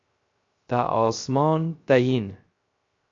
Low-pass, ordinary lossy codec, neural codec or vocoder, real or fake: 7.2 kHz; MP3, 48 kbps; codec, 16 kHz, 0.3 kbps, FocalCodec; fake